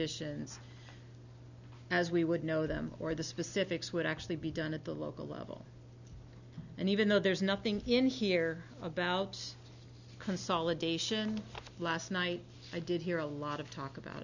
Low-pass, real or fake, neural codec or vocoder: 7.2 kHz; real; none